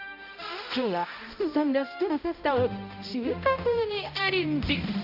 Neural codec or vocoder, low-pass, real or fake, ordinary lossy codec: codec, 16 kHz, 0.5 kbps, X-Codec, HuBERT features, trained on balanced general audio; 5.4 kHz; fake; none